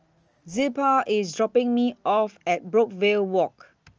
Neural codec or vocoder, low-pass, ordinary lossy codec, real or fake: none; 7.2 kHz; Opus, 24 kbps; real